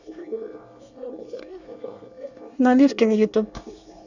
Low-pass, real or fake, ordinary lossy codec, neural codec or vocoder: 7.2 kHz; fake; none; codec, 24 kHz, 1 kbps, SNAC